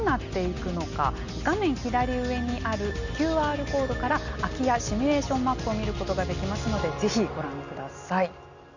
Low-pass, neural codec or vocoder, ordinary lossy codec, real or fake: 7.2 kHz; none; none; real